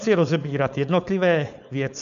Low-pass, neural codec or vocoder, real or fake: 7.2 kHz; codec, 16 kHz, 4.8 kbps, FACodec; fake